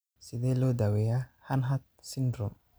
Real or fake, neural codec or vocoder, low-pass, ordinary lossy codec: real; none; none; none